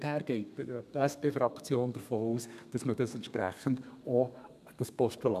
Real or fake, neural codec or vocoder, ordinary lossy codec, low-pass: fake; codec, 32 kHz, 1.9 kbps, SNAC; none; 14.4 kHz